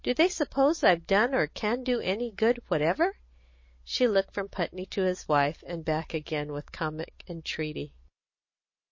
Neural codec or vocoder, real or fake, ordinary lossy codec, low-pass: none; real; MP3, 32 kbps; 7.2 kHz